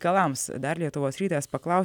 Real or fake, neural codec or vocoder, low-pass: real; none; 19.8 kHz